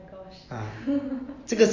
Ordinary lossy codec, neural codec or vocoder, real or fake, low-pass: AAC, 32 kbps; none; real; 7.2 kHz